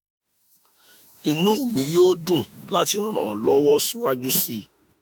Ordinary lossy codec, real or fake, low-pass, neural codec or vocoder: none; fake; none; autoencoder, 48 kHz, 32 numbers a frame, DAC-VAE, trained on Japanese speech